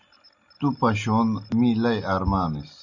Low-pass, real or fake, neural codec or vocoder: 7.2 kHz; real; none